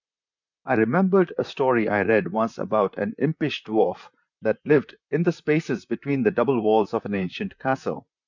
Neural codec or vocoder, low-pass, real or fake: vocoder, 44.1 kHz, 128 mel bands, Pupu-Vocoder; 7.2 kHz; fake